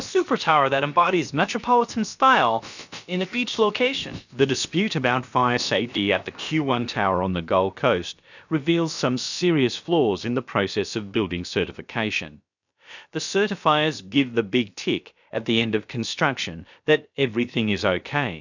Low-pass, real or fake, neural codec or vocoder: 7.2 kHz; fake; codec, 16 kHz, about 1 kbps, DyCAST, with the encoder's durations